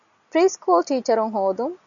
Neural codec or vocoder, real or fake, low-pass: none; real; 7.2 kHz